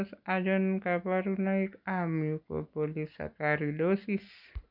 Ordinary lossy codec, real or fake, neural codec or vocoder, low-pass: none; real; none; 5.4 kHz